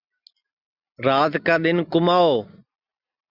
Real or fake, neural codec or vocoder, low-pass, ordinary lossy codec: real; none; 5.4 kHz; Opus, 64 kbps